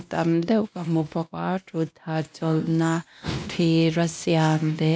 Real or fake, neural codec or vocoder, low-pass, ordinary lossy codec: fake; codec, 16 kHz, 1 kbps, X-Codec, WavLM features, trained on Multilingual LibriSpeech; none; none